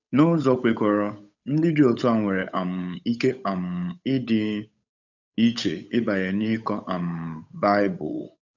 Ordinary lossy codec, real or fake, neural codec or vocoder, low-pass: none; fake; codec, 16 kHz, 8 kbps, FunCodec, trained on Chinese and English, 25 frames a second; 7.2 kHz